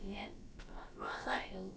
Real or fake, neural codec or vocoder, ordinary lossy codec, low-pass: fake; codec, 16 kHz, about 1 kbps, DyCAST, with the encoder's durations; none; none